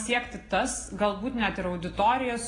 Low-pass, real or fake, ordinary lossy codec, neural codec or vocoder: 10.8 kHz; real; AAC, 32 kbps; none